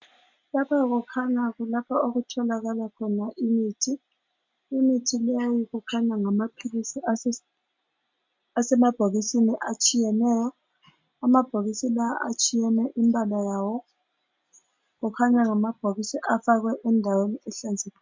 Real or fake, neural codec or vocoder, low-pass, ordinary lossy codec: real; none; 7.2 kHz; MP3, 64 kbps